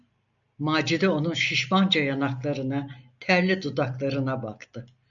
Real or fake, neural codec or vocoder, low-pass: real; none; 7.2 kHz